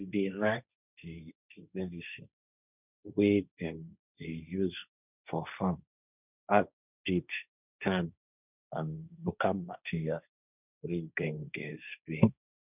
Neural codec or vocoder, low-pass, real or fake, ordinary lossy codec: codec, 16 kHz, 1.1 kbps, Voila-Tokenizer; 3.6 kHz; fake; none